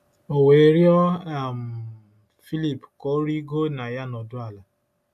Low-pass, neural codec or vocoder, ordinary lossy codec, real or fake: 14.4 kHz; none; AAC, 96 kbps; real